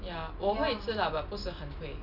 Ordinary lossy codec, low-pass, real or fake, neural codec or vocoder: none; 5.4 kHz; real; none